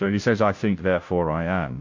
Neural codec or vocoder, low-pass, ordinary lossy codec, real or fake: codec, 16 kHz, 0.5 kbps, FunCodec, trained on Chinese and English, 25 frames a second; 7.2 kHz; MP3, 48 kbps; fake